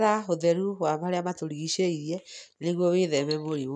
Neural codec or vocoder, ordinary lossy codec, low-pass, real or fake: none; none; none; real